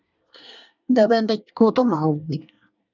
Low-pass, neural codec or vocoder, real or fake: 7.2 kHz; codec, 24 kHz, 1 kbps, SNAC; fake